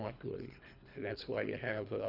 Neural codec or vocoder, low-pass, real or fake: codec, 24 kHz, 3 kbps, HILCodec; 5.4 kHz; fake